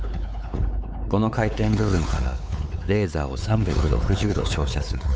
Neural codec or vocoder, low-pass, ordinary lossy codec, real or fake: codec, 16 kHz, 4 kbps, X-Codec, WavLM features, trained on Multilingual LibriSpeech; none; none; fake